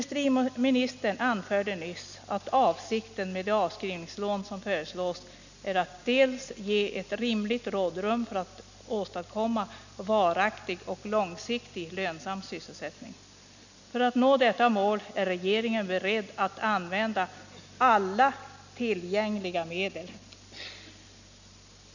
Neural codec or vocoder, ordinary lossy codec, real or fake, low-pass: none; none; real; 7.2 kHz